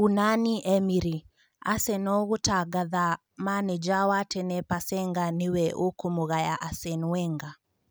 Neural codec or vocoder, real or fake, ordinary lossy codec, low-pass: none; real; none; none